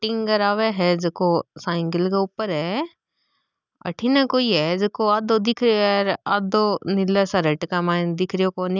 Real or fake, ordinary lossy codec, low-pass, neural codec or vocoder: real; none; 7.2 kHz; none